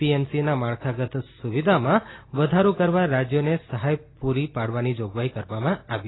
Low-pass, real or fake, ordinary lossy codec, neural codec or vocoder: 7.2 kHz; real; AAC, 16 kbps; none